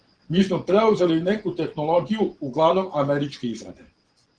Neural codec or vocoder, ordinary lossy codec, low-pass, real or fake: vocoder, 24 kHz, 100 mel bands, Vocos; Opus, 16 kbps; 9.9 kHz; fake